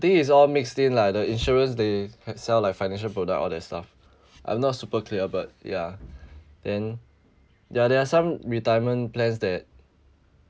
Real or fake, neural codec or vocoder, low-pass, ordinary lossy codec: real; none; none; none